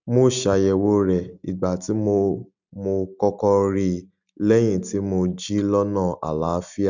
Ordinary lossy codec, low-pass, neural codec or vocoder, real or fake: none; 7.2 kHz; none; real